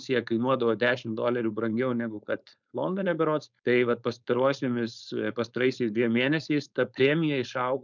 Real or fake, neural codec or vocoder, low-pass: fake; codec, 16 kHz, 4.8 kbps, FACodec; 7.2 kHz